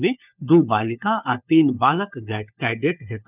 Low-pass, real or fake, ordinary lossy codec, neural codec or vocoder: 3.6 kHz; fake; none; codec, 16 kHz, 4 kbps, FunCodec, trained on LibriTTS, 50 frames a second